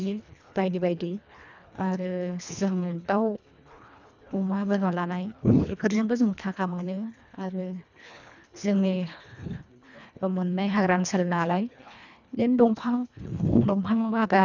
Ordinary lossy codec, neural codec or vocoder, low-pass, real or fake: none; codec, 24 kHz, 1.5 kbps, HILCodec; 7.2 kHz; fake